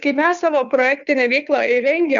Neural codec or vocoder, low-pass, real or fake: codec, 16 kHz, 2 kbps, FunCodec, trained on Chinese and English, 25 frames a second; 7.2 kHz; fake